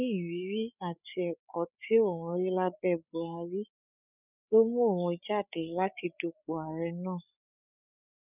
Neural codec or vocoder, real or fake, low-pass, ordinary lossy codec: codec, 16 kHz, 8 kbps, FreqCodec, larger model; fake; 3.6 kHz; none